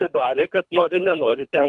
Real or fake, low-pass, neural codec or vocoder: fake; 10.8 kHz; codec, 24 kHz, 3 kbps, HILCodec